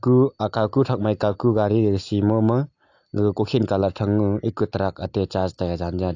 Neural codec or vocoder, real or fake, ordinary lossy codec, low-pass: none; real; AAC, 48 kbps; 7.2 kHz